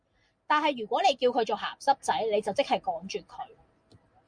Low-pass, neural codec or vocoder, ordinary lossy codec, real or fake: 9.9 kHz; none; Opus, 64 kbps; real